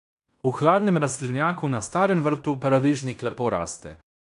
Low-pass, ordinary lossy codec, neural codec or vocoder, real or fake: 10.8 kHz; none; codec, 16 kHz in and 24 kHz out, 0.9 kbps, LongCat-Audio-Codec, fine tuned four codebook decoder; fake